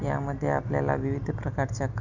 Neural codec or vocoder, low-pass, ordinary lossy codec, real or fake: none; 7.2 kHz; none; real